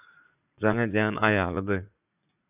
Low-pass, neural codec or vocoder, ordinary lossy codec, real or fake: 3.6 kHz; vocoder, 44.1 kHz, 80 mel bands, Vocos; AAC, 32 kbps; fake